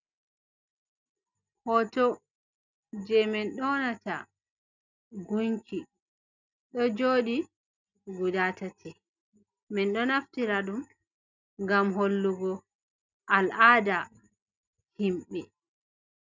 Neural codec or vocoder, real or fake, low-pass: none; real; 7.2 kHz